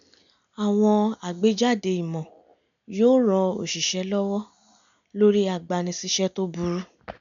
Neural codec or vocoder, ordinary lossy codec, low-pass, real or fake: none; none; 7.2 kHz; real